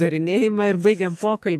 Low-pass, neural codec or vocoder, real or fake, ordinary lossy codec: 14.4 kHz; codec, 44.1 kHz, 2.6 kbps, SNAC; fake; AAC, 96 kbps